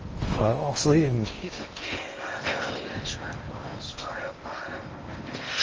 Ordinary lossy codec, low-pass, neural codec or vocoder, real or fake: Opus, 24 kbps; 7.2 kHz; codec, 16 kHz in and 24 kHz out, 0.8 kbps, FocalCodec, streaming, 65536 codes; fake